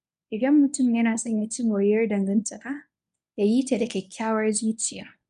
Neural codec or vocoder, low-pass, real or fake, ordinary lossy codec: codec, 24 kHz, 0.9 kbps, WavTokenizer, medium speech release version 1; 10.8 kHz; fake; none